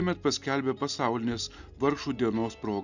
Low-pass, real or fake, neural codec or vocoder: 7.2 kHz; real; none